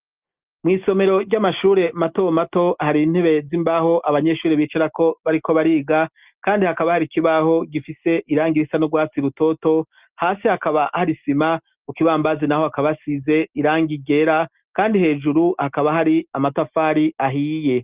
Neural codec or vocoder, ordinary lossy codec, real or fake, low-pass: none; Opus, 32 kbps; real; 3.6 kHz